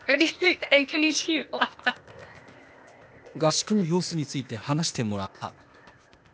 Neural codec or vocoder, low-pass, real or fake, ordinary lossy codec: codec, 16 kHz, 0.8 kbps, ZipCodec; none; fake; none